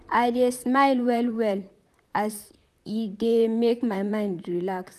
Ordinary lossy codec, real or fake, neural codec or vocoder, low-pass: none; fake; vocoder, 44.1 kHz, 128 mel bands, Pupu-Vocoder; 14.4 kHz